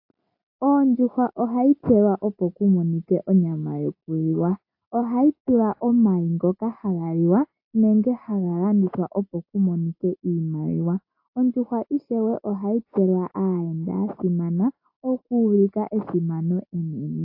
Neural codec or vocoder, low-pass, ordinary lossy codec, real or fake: none; 5.4 kHz; AAC, 32 kbps; real